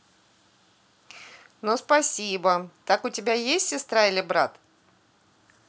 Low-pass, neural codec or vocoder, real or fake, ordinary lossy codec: none; none; real; none